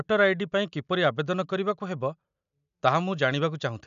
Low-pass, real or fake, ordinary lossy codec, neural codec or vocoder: 7.2 kHz; real; none; none